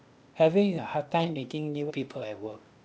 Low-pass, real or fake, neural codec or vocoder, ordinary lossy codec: none; fake; codec, 16 kHz, 0.8 kbps, ZipCodec; none